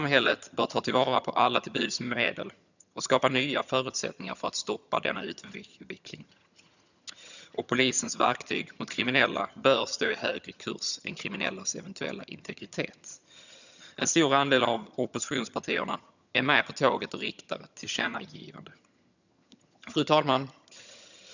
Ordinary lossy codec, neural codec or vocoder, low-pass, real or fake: none; vocoder, 22.05 kHz, 80 mel bands, HiFi-GAN; 7.2 kHz; fake